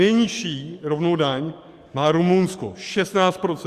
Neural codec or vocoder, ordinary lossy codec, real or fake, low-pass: codec, 44.1 kHz, 7.8 kbps, DAC; Opus, 64 kbps; fake; 14.4 kHz